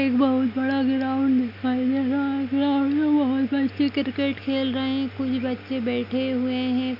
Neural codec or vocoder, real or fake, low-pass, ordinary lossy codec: none; real; 5.4 kHz; none